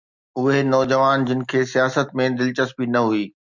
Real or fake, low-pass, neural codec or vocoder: real; 7.2 kHz; none